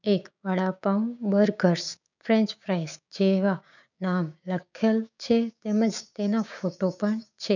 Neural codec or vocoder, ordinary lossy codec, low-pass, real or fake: codec, 16 kHz, 6 kbps, DAC; none; 7.2 kHz; fake